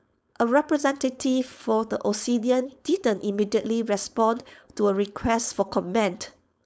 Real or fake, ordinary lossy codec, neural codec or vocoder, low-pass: fake; none; codec, 16 kHz, 4.8 kbps, FACodec; none